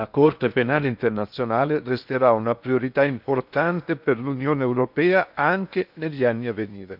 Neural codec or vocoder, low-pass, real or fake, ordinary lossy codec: codec, 16 kHz in and 24 kHz out, 0.8 kbps, FocalCodec, streaming, 65536 codes; 5.4 kHz; fake; none